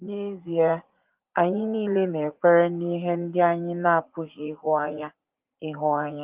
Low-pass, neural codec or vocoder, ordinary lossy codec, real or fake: 3.6 kHz; vocoder, 44.1 kHz, 80 mel bands, Vocos; Opus, 32 kbps; fake